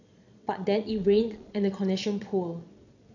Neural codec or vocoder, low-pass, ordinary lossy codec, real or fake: vocoder, 22.05 kHz, 80 mel bands, Vocos; 7.2 kHz; none; fake